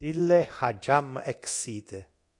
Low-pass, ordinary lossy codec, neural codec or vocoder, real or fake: 10.8 kHz; AAC, 48 kbps; codec, 24 kHz, 0.9 kbps, DualCodec; fake